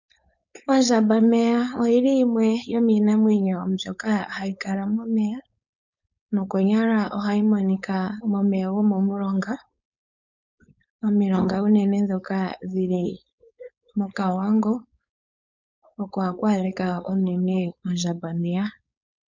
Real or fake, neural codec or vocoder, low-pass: fake; codec, 16 kHz, 4.8 kbps, FACodec; 7.2 kHz